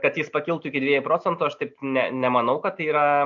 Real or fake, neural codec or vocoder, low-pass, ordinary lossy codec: real; none; 7.2 kHz; MP3, 48 kbps